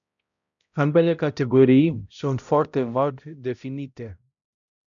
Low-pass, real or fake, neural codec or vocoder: 7.2 kHz; fake; codec, 16 kHz, 0.5 kbps, X-Codec, HuBERT features, trained on balanced general audio